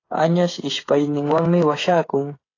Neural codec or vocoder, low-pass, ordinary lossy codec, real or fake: codec, 16 kHz, 8 kbps, FreqCodec, smaller model; 7.2 kHz; AAC, 32 kbps; fake